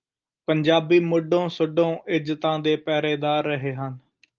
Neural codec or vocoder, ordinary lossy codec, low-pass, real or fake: none; Opus, 24 kbps; 7.2 kHz; real